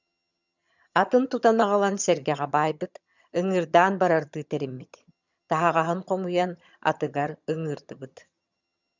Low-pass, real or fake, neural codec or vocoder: 7.2 kHz; fake; vocoder, 22.05 kHz, 80 mel bands, HiFi-GAN